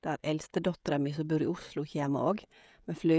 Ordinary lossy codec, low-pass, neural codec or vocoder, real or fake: none; none; codec, 16 kHz, 16 kbps, FreqCodec, smaller model; fake